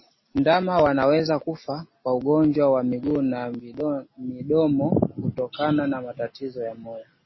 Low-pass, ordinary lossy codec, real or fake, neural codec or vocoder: 7.2 kHz; MP3, 24 kbps; real; none